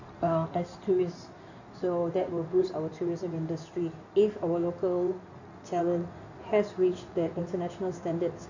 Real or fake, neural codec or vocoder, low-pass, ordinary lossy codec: fake; codec, 16 kHz in and 24 kHz out, 2.2 kbps, FireRedTTS-2 codec; 7.2 kHz; none